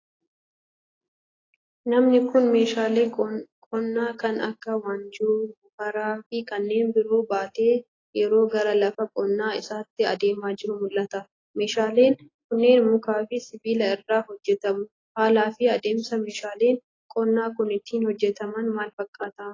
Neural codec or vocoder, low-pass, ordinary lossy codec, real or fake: none; 7.2 kHz; AAC, 32 kbps; real